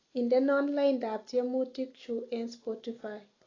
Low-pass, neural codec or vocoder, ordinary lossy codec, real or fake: 7.2 kHz; none; AAC, 32 kbps; real